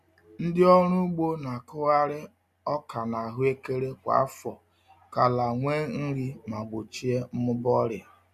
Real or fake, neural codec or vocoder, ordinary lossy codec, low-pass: real; none; none; 14.4 kHz